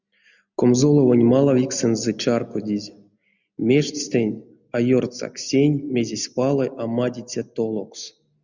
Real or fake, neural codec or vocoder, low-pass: real; none; 7.2 kHz